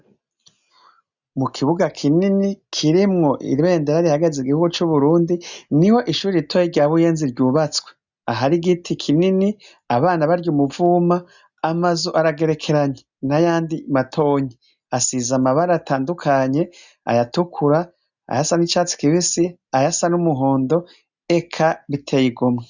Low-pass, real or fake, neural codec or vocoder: 7.2 kHz; real; none